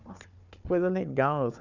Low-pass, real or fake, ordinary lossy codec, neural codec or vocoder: 7.2 kHz; fake; none; codec, 16 kHz, 4 kbps, FunCodec, trained on Chinese and English, 50 frames a second